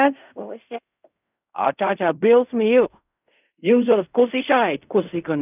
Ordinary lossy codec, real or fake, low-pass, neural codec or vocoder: none; fake; 3.6 kHz; codec, 16 kHz in and 24 kHz out, 0.4 kbps, LongCat-Audio-Codec, fine tuned four codebook decoder